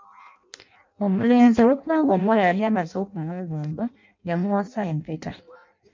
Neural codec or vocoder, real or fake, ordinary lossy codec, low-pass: codec, 16 kHz in and 24 kHz out, 0.6 kbps, FireRedTTS-2 codec; fake; MP3, 48 kbps; 7.2 kHz